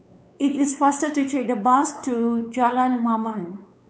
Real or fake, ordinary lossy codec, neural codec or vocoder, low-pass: fake; none; codec, 16 kHz, 4 kbps, X-Codec, WavLM features, trained on Multilingual LibriSpeech; none